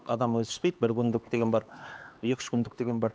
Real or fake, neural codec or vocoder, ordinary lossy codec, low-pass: fake; codec, 16 kHz, 2 kbps, X-Codec, HuBERT features, trained on LibriSpeech; none; none